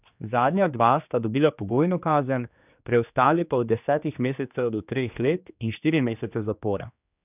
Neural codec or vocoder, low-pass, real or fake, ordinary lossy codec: codec, 16 kHz, 2 kbps, X-Codec, HuBERT features, trained on general audio; 3.6 kHz; fake; none